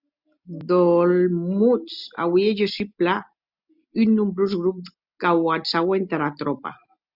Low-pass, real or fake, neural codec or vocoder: 5.4 kHz; real; none